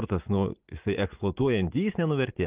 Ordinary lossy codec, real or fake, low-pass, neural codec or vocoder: Opus, 32 kbps; fake; 3.6 kHz; vocoder, 44.1 kHz, 80 mel bands, Vocos